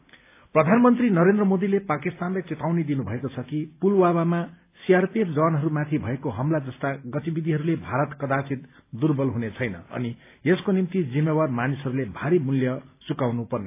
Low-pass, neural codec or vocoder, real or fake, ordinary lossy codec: 3.6 kHz; none; real; AAC, 24 kbps